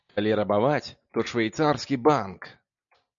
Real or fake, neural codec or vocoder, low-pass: real; none; 7.2 kHz